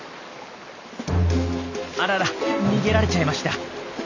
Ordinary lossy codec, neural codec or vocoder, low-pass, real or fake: none; none; 7.2 kHz; real